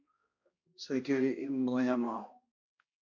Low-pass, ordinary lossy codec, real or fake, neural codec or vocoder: 7.2 kHz; MP3, 48 kbps; fake; codec, 16 kHz, 1 kbps, X-Codec, HuBERT features, trained on balanced general audio